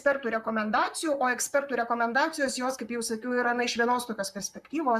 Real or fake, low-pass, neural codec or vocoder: fake; 14.4 kHz; vocoder, 44.1 kHz, 128 mel bands, Pupu-Vocoder